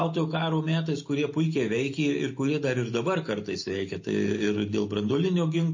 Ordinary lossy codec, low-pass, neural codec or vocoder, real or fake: MP3, 32 kbps; 7.2 kHz; none; real